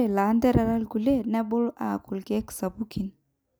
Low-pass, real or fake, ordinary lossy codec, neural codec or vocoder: none; real; none; none